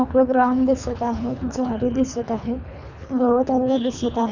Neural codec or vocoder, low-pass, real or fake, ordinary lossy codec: codec, 24 kHz, 3 kbps, HILCodec; 7.2 kHz; fake; none